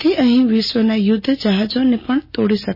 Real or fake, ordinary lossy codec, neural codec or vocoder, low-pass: real; MP3, 24 kbps; none; 5.4 kHz